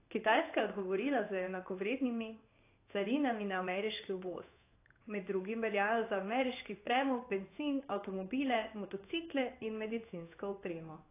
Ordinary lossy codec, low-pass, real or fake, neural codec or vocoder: AAC, 24 kbps; 3.6 kHz; fake; codec, 16 kHz in and 24 kHz out, 1 kbps, XY-Tokenizer